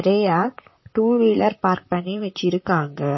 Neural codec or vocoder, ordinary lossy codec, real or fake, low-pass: vocoder, 44.1 kHz, 128 mel bands, Pupu-Vocoder; MP3, 24 kbps; fake; 7.2 kHz